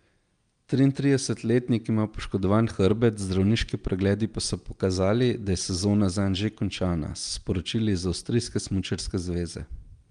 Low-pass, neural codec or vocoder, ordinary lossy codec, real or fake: 9.9 kHz; none; Opus, 32 kbps; real